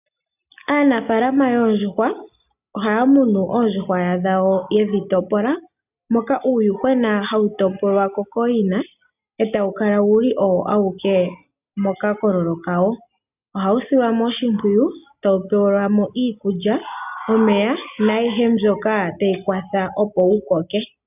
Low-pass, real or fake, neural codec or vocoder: 3.6 kHz; real; none